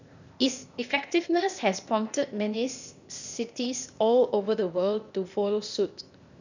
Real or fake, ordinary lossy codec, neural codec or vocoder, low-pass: fake; none; codec, 16 kHz, 0.8 kbps, ZipCodec; 7.2 kHz